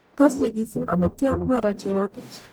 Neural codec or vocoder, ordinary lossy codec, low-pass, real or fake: codec, 44.1 kHz, 0.9 kbps, DAC; none; none; fake